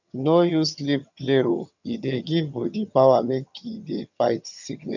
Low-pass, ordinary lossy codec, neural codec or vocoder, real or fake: 7.2 kHz; none; vocoder, 22.05 kHz, 80 mel bands, HiFi-GAN; fake